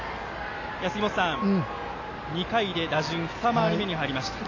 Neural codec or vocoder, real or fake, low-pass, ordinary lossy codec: none; real; 7.2 kHz; AAC, 32 kbps